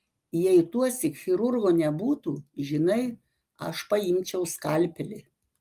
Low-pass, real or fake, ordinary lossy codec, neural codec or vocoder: 14.4 kHz; real; Opus, 32 kbps; none